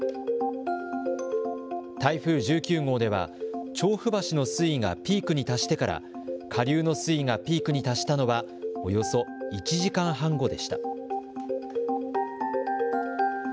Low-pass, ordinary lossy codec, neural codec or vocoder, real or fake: none; none; none; real